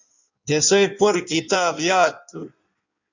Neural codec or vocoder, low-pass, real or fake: codec, 16 kHz in and 24 kHz out, 1.1 kbps, FireRedTTS-2 codec; 7.2 kHz; fake